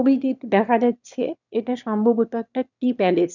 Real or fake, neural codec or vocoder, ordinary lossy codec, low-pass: fake; autoencoder, 22.05 kHz, a latent of 192 numbers a frame, VITS, trained on one speaker; none; 7.2 kHz